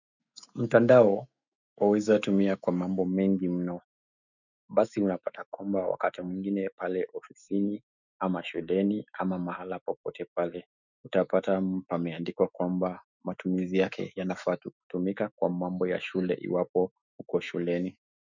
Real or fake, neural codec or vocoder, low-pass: fake; autoencoder, 48 kHz, 128 numbers a frame, DAC-VAE, trained on Japanese speech; 7.2 kHz